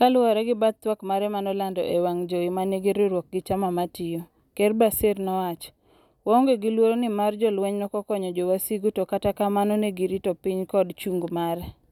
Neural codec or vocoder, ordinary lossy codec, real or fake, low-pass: none; none; real; 19.8 kHz